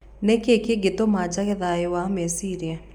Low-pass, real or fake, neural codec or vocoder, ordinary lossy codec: 19.8 kHz; real; none; MP3, 96 kbps